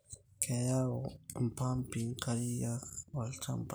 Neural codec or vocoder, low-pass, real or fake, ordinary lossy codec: none; none; real; none